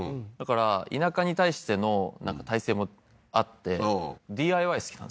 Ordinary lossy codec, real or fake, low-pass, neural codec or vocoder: none; real; none; none